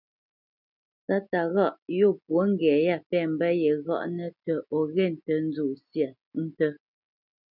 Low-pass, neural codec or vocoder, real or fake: 5.4 kHz; none; real